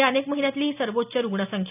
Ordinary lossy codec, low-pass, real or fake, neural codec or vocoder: none; 3.6 kHz; real; none